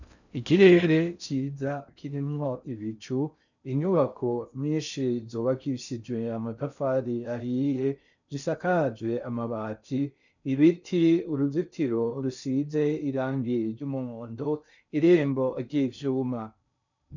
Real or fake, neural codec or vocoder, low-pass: fake; codec, 16 kHz in and 24 kHz out, 0.6 kbps, FocalCodec, streaming, 4096 codes; 7.2 kHz